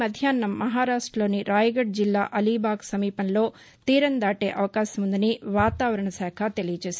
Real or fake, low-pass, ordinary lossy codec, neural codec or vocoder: real; none; none; none